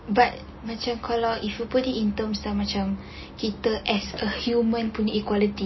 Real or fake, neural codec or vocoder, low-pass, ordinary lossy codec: real; none; 7.2 kHz; MP3, 24 kbps